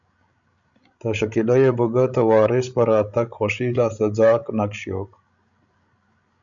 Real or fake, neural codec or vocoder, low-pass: fake; codec, 16 kHz, 16 kbps, FreqCodec, larger model; 7.2 kHz